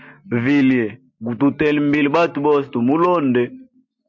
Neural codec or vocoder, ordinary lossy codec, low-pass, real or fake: none; MP3, 48 kbps; 7.2 kHz; real